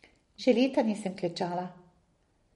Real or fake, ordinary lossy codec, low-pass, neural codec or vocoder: real; MP3, 48 kbps; 10.8 kHz; none